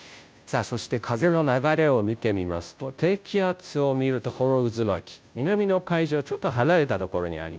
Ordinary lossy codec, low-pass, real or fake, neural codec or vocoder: none; none; fake; codec, 16 kHz, 0.5 kbps, FunCodec, trained on Chinese and English, 25 frames a second